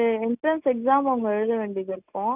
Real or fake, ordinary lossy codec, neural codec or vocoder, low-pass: real; none; none; 3.6 kHz